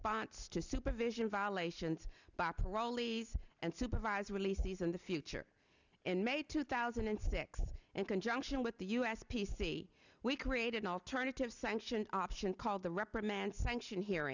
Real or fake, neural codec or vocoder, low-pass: real; none; 7.2 kHz